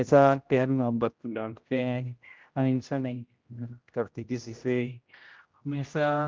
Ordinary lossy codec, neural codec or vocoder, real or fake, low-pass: Opus, 24 kbps; codec, 16 kHz, 0.5 kbps, X-Codec, HuBERT features, trained on general audio; fake; 7.2 kHz